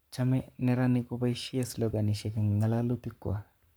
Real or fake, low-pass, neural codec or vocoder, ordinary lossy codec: fake; none; codec, 44.1 kHz, 7.8 kbps, Pupu-Codec; none